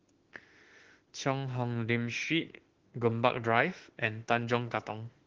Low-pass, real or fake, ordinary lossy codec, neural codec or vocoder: 7.2 kHz; fake; Opus, 16 kbps; autoencoder, 48 kHz, 32 numbers a frame, DAC-VAE, trained on Japanese speech